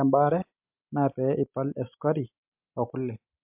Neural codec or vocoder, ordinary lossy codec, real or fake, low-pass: none; MP3, 32 kbps; real; 3.6 kHz